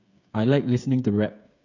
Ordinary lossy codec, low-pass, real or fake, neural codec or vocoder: none; 7.2 kHz; fake; codec, 16 kHz, 8 kbps, FreqCodec, smaller model